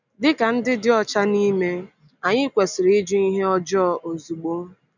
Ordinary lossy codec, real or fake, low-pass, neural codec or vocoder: none; real; 7.2 kHz; none